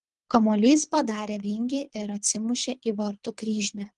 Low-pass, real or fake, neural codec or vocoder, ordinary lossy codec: 10.8 kHz; fake; codec, 24 kHz, 3 kbps, HILCodec; Opus, 24 kbps